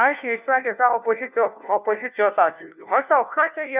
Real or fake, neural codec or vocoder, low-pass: fake; codec, 16 kHz, 1 kbps, FunCodec, trained on LibriTTS, 50 frames a second; 3.6 kHz